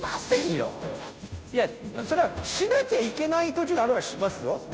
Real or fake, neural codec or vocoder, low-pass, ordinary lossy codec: fake; codec, 16 kHz, 0.5 kbps, FunCodec, trained on Chinese and English, 25 frames a second; none; none